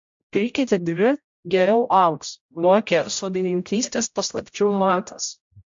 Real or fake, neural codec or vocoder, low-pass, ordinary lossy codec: fake; codec, 16 kHz, 0.5 kbps, X-Codec, HuBERT features, trained on general audio; 7.2 kHz; MP3, 48 kbps